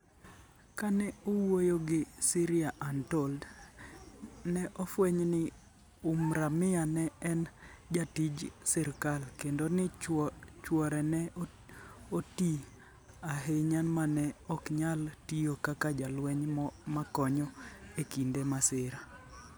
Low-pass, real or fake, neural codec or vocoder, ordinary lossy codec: none; real; none; none